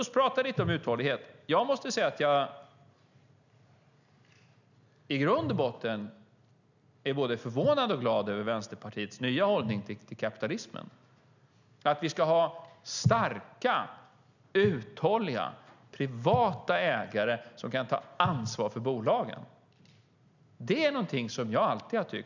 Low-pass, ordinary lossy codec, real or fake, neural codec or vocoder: 7.2 kHz; none; real; none